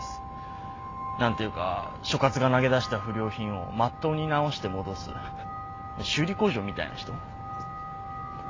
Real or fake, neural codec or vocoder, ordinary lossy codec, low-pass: real; none; AAC, 32 kbps; 7.2 kHz